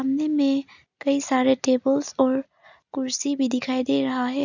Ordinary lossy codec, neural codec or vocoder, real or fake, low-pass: none; none; real; 7.2 kHz